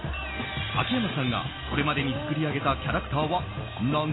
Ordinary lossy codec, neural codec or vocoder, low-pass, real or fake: AAC, 16 kbps; none; 7.2 kHz; real